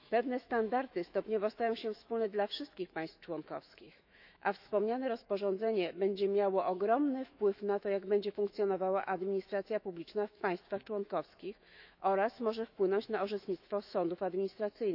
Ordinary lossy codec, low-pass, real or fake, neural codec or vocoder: none; 5.4 kHz; fake; autoencoder, 48 kHz, 128 numbers a frame, DAC-VAE, trained on Japanese speech